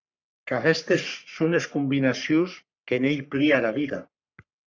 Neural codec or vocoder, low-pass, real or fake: codec, 44.1 kHz, 3.4 kbps, Pupu-Codec; 7.2 kHz; fake